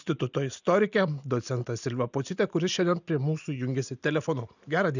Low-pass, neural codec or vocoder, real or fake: 7.2 kHz; none; real